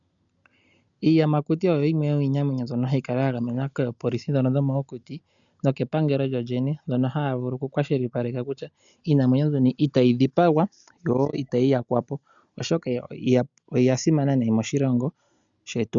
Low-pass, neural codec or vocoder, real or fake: 7.2 kHz; none; real